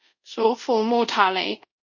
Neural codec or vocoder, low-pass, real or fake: codec, 24 kHz, 0.5 kbps, DualCodec; 7.2 kHz; fake